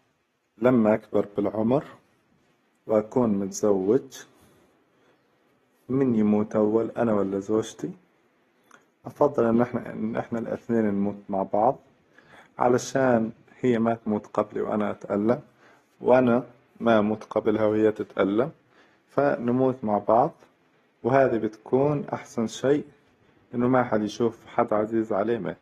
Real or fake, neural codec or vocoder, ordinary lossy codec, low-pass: real; none; AAC, 32 kbps; 19.8 kHz